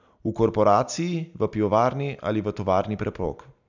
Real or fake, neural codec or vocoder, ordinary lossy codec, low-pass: real; none; none; 7.2 kHz